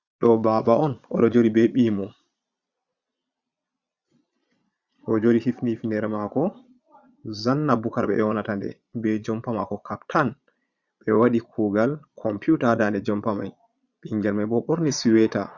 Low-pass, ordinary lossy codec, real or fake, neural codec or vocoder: 7.2 kHz; Opus, 64 kbps; fake; vocoder, 22.05 kHz, 80 mel bands, Vocos